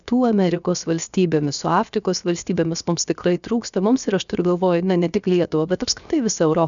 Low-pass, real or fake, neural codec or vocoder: 7.2 kHz; fake; codec, 16 kHz, 0.7 kbps, FocalCodec